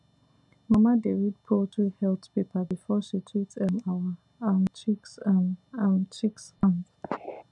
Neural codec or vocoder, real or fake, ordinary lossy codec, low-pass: none; real; none; 10.8 kHz